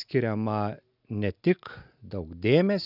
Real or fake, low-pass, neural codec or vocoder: real; 5.4 kHz; none